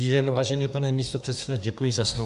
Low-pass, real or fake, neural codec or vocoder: 10.8 kHz; fake; codec, 24 kHz, 1 kbps, SNAC